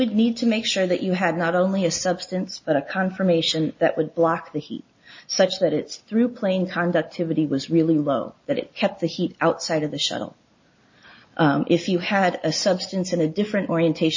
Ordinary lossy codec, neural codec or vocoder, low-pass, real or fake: MP3, 32 kbps; none; 7.2 kHz; real